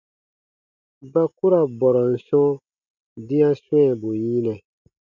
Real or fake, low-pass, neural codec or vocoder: real; 7.2 kHz; none